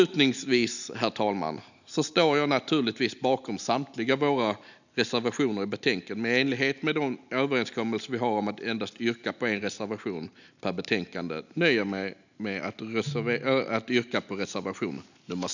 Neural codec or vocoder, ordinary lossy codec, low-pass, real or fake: none; none; 7.2 kHz; real